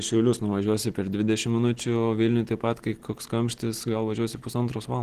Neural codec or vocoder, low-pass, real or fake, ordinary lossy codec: none; 14.4 kHz; real; Opus, 16 kbps